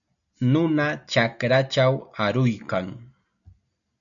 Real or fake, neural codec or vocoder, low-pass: real; none; 7.2 kHz